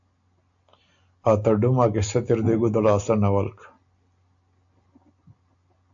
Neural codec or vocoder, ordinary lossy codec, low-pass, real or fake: none; AAC, 64 kbps; 7.2 kHz; real